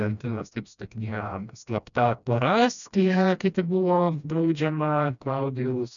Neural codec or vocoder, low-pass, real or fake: codec, 16 kHz, 1 kbps, FreqCodec, smaller model; 7.2 kHz; fake